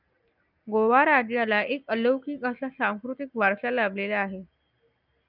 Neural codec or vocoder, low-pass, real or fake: none; 5.4 kHz; real